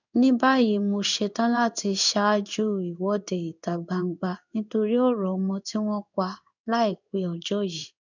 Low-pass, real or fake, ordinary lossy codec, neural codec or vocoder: 7.2 kHz; fake; none; codec, 16 kHz in and 24 kHz out, 1 kbps, XY-Tokenizer